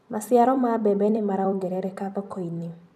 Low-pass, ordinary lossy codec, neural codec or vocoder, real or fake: 14.4 kHz; none; vocoder, 44.1 kHz, 128 mel bands every 256 samples, BigVGAN v2; fake